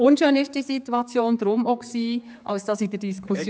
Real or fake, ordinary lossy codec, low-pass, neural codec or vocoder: fake; none; none; codec, 16 kHz, 4 kbps, X-Codec, HuBERT features, trained on general audio